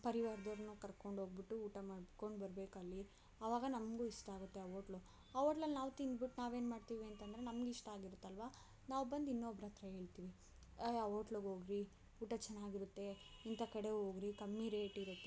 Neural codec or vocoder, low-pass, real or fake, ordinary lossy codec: none; none; real; none